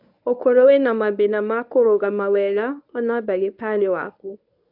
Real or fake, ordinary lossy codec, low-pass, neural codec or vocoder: fake; AAC, 48 kbps; 5.4 kHz; codec, 24 kHz, 0.9 kbps, WavTokenizer, medium speech release version 1